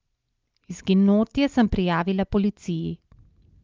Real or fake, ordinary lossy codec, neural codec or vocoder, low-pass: real; Opus, 24 kbps; none; 7.2 kHz